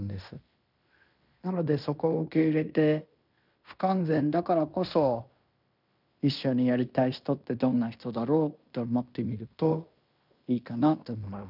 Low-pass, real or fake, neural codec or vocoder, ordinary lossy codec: 5.4 kHz; fake; codec, 16 kHz in and 24 kHz out, 0.9 kbps, LongCat-Audio-Codec, fine tuned four codebook decoder; none